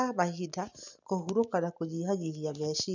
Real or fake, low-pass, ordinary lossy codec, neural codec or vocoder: real; 7.2 kHz; none; none